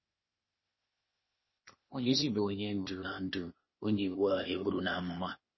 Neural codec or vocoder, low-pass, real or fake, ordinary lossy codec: codec, 16 kHz, 0.8 kbps, ZipCodec; 7.2 kHz; fake; MP3, 24 kbps